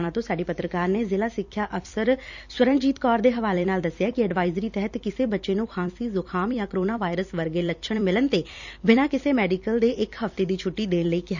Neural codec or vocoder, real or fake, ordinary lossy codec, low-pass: vocoder, 44.1 kHz, 128 mel bands every 256 samples, BigVGAN v2; fake; none; 7.2 kHz